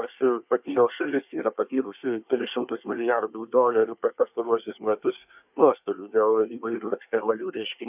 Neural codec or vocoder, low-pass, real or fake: codec, 24 kHz, 1 kbps, SNAC; 3.6 kHz; fake